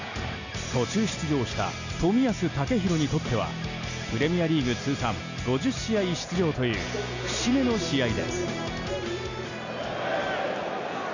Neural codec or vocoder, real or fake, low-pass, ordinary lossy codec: none; real; 7.2 kHz; none